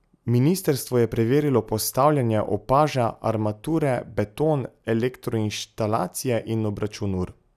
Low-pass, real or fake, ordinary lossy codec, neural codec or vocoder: 14.4 kHz; real; none; none